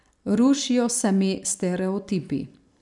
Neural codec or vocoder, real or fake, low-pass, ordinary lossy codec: vocoder, 44.1 kHz, 128 mel bands every 256 samples, BigVGAN v2; fake; 10.8 kHz; none